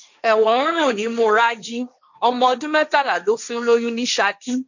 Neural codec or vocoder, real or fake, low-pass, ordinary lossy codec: codec, 16 kHz, 1.1 kbps, Voila-Tokenizer; fake; 7.2 kHz; none